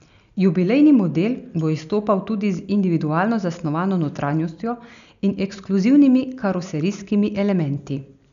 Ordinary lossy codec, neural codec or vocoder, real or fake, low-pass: none; none; real; 7.2 kHz